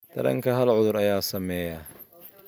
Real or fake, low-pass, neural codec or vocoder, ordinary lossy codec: real; none; none; none